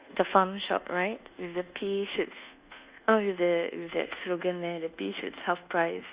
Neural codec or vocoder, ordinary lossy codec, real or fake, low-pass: codec, 24 kHz, 1.2 kbps, DualCodec; Opus, 64 kbps; fake; 3.6 kHz